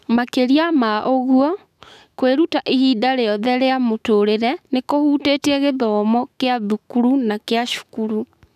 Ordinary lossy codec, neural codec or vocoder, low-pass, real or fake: none; autoencoder, 48 kHz, 128 numbers a frame, DAC-VAE, trained on Japanese speech; 14.4 kHz; fake